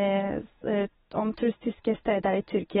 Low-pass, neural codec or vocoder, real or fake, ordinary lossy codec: 19.8 kHz; none; real; AAC, 16 kbps